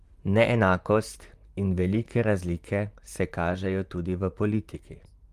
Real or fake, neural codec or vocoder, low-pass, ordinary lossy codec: fake; vocoder, 44.1 kHz, 128 mel bands, Pupu-Vocoder; 14.4 kHz; Opus, 32 kbps